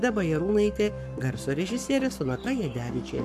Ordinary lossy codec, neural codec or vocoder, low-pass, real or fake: AAC, 96 kbps; codec, 44.1 kHz, 7.8 kbps, Pupu-Codec; 14.4 kHz; fake